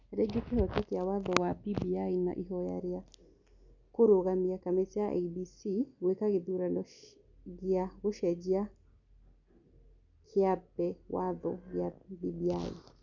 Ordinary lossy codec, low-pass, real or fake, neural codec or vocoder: none; 7.2 kHz; real; none